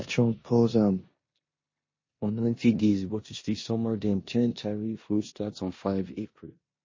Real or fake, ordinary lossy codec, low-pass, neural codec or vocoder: fake; MP3, 32 kbps; 7.2 kHz; codec, 16 kHz in and 24 kHz out, 0.9 kbps, LongCat-Audio-Codec, four codebook decoder